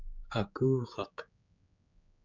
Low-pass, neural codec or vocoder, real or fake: 7.2 kHz; codec, 16 kHz, 4 kbps, X-Codec, HuBERT features, trained on general audio; fake